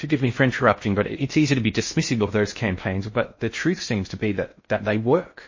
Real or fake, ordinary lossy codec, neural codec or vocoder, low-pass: fake; MP3, 32 kbps; codec, 16 kHz in and 24 kHz out, 0.6 kbps, FocalCodec, streaming, 4096 codes; 7.2 kHz